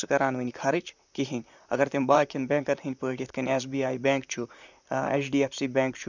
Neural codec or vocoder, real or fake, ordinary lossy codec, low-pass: vocoder, 44.1 kHz, 128 mel bands, Pupu-Vocoder; fake; none; 7.2 kHz